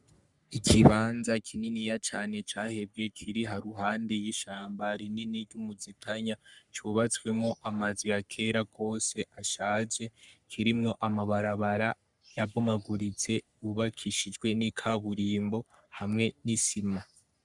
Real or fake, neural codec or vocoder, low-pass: fake; codec, 44.1 kHz, 3.4 kbps, Pupu-Codec; 10.8 kHz